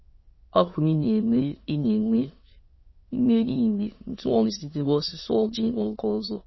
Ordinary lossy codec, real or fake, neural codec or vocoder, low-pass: MP3, 24 kbps; fake; autoencoder, 22.05 kHz, a latent of 192 numbers a frame, VITS, trained on many speakers; 7.2 kHz